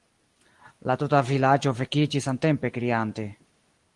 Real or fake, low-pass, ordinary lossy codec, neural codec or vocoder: real; 10.8 kHz; Opus, 24 kbps; none